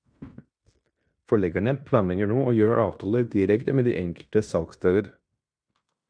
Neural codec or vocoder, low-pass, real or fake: codec, 16 kHz in and 24 kHz out, 0.9 kbps, LongCat-Audio-Codec, fine tuned four codebook decoder; 9.9 kHz; fake